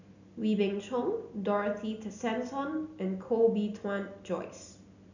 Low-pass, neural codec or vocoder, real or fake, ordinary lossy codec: 7.2 kHz; none; real; none